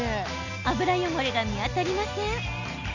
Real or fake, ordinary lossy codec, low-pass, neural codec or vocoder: real; none; 7.2 kHz; none